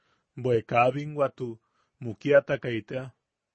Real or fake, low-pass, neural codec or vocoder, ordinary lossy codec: real; 9.9 kHz; none; MP3, 32 kbps